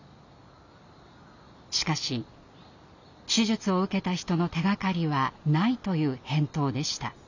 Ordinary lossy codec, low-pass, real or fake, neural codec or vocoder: none; 7.2 kHz; real; none